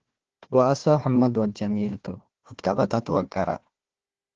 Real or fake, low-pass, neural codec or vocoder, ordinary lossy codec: fake; 7.2 kHz; codec, 16 kHz, 1 kbps, FunCodec, trained on Chinese and English, 50 frames a second; Opus, 16 kbps